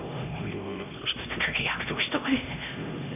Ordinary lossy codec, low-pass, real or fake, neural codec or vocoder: none; 3.6 kHz; fake; codec, 16 kHz, 1 kbps, X-Codec, HuBERT features, trained on LibriSpeech